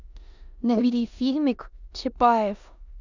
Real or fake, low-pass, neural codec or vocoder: fake; 7.2 kHz; codec, 16 kHz in and 24 kHz out, 0.9 kbps, LongCat-Audio-Codec, four codebook decoder